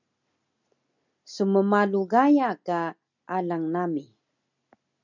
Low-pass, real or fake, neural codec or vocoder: 7.2 kHz; real; none